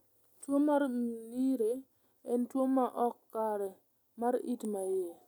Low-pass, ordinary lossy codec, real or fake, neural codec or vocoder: 19.8 kHz; none; real; none